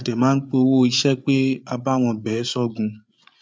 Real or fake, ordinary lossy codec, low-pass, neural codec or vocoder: fake; none; none; codec, 16 kHz, 8 kbps, FreqCodec, larger model